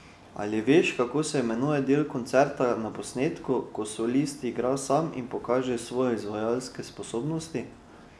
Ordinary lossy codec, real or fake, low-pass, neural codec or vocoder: none; real; none; none